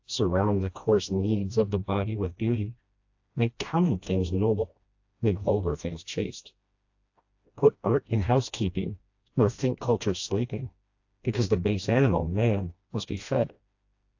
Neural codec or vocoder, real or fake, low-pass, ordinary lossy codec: codec, 16 kHz, 1 kbps, FreqCodec, smaller model; fake; 7.2 kHz; AAC, 48 kbps